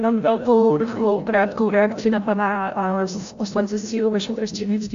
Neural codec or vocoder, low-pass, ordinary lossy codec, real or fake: codec, 16 kHz, 0.5 kbps, FreqCodec, larger model; 7.2 kHz; AAC, 64 kbps; fake